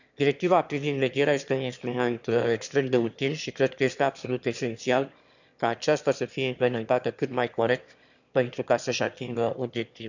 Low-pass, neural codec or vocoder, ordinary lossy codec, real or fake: 7.2 kHz; autoencoder, 22.05 kHz, a latent of 192 numbers a frame, VITS, trained on one speaker; none; fake